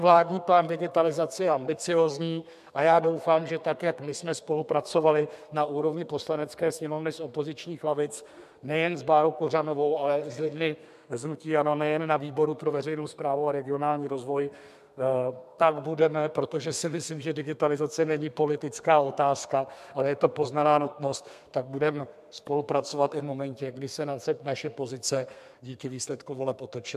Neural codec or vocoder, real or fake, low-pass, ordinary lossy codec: codec, 32 kHz, 1.9 kbps, SNAC; fake; 14.4 kHz; MP3, 96 kbps